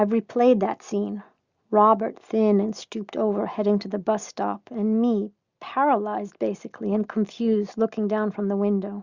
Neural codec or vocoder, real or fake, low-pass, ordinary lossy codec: none; real; 7.2 kHz; Opus, 64 kbps